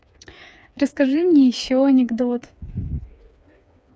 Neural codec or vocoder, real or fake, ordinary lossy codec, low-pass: codec, 16 kHz, 4 kbps, FreqCodec, smaller model; fake; none; none